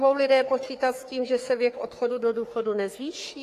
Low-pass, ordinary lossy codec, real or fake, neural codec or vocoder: 14.4 kHz; AAC, 48 kbps; fake; codec, 44.1 kHz, 3.4 kbps, Pupu-Codec